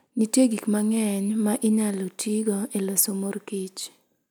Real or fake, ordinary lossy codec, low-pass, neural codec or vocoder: real; none; none; none